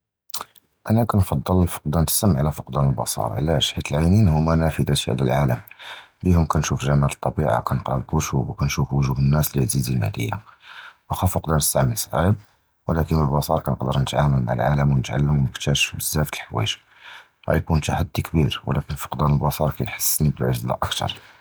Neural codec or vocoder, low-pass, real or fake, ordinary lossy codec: none; none; real; none